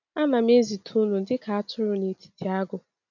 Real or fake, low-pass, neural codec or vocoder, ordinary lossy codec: real; 7.2 kHz; none; none